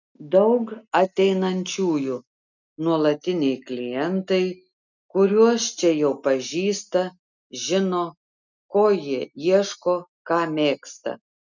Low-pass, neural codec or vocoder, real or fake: 7.2 kHz; none; real